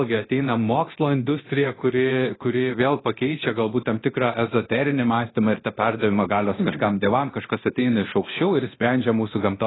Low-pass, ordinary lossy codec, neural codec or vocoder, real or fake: 7.2 kHz; AAC, 16 kbps; codec, 24 kHz, 0.9 kbps, DualCodec; fake